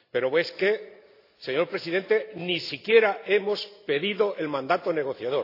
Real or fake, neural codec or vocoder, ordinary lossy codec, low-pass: real; none; AAC, 32 kbps; 5.4 kHz